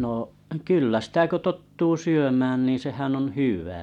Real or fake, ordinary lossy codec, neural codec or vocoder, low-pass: real; none; none; 19.8 kHz